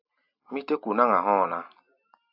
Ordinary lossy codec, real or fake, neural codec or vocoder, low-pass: AAC, 48 kbps; real; none; 5.4 kHz